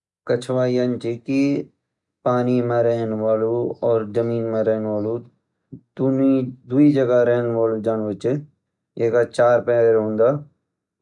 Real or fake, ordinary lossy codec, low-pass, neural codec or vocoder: real; none; 10.8 kHz; none